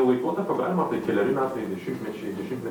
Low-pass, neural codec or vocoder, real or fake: 19.8 kHz; none; real